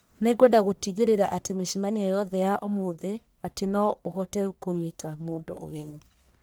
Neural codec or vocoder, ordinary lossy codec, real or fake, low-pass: codec, 44.1 kHz, 1.7 kbps, Pupu-Codec; none; fake; none